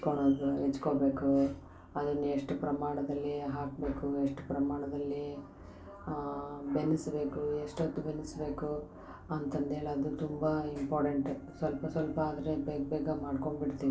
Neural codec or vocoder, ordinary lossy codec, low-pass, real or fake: none; none; none; real